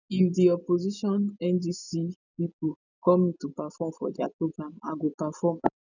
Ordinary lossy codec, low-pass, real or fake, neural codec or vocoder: none; 7.2 kHz; real; none